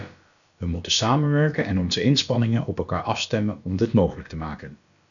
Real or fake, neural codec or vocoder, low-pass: fake; codec, 16 kHz, about 1 kbps, DyCAST, with the encoder's durations; 7.2 kHz